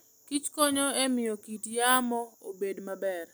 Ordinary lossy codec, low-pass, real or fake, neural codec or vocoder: none; none; real; none